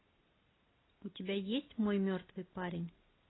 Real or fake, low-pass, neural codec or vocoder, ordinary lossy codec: real; 7.2 kHz; none; AAC, 16 kbps